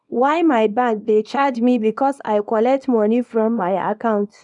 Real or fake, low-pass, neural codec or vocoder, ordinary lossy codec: fake; 10.8 kHz; codec, 24 kHz, 0.9 kbps, WavTokenizer, small release; none